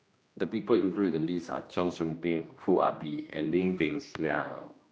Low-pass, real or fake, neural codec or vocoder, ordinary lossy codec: none; fake; codec, 16 kHz, 1 kbps, X-Codec, HuBERT features, trained on general audio; none